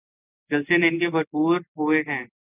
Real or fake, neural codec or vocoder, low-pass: real; none; 3.6 kHz